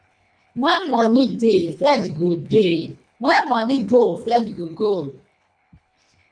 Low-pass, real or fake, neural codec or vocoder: 9.9 kHz; fake; codec, 24 kHz, 1.5 kbps, HILCodec